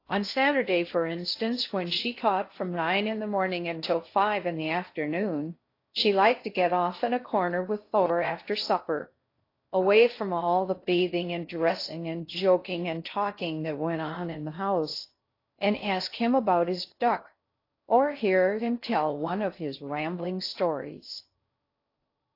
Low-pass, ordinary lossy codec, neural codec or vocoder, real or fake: 5.4 kHz; AAC, 32 kbps; codec, 16 kHz in and 24 kHz out, 0.6 kbps, FocalCodec, streaming, 2048 codes; fake